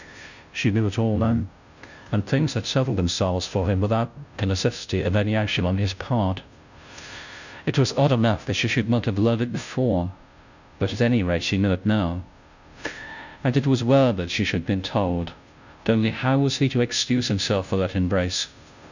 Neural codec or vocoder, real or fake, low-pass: codec, 16 kHz, 0.5 kbps, FunCodec, trained on Chinese and English, 25 frames a second; fake; 7.2 kHz